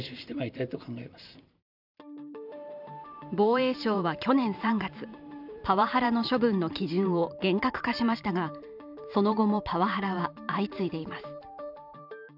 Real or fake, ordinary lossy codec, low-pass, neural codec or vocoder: fake; none; 5.4 kHz; vocoder, 44.1 kHz, 128 mel bands every 512 samples, BigVGAN v2